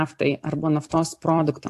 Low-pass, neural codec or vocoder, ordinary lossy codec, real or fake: 14.4 kHz; vocoder, 44.1 kHz, 128 mel bands every 256 samples, BigVGAN v2; AAC, 64 kbps; fake